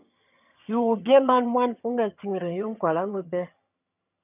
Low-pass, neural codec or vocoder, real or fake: 3.6 kHz; vocoder, 22.05 kHz, 80 mel bands, HiFi-GAN; fake